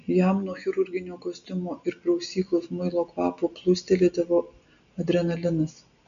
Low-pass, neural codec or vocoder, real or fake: 7.2 kHz; none; real